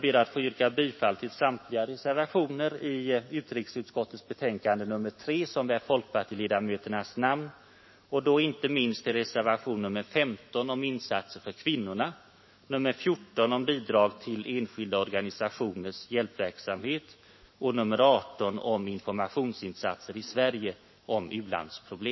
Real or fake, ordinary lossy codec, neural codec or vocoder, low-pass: fake; MP3, 24 kbps; autoencoder, 48 kHz, 128 numbers a frame, DAC-VAE, trained on Japanese speech; 7.2 kHz